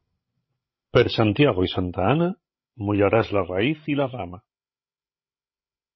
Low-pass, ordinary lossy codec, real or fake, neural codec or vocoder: 7.2 kHz; MP3, 24 kbps; fake; codec, 16 kHz, 16 kbps, FreqCodec, larger model